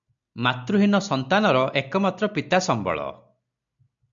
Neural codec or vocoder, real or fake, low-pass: none; real; 7.2 kHz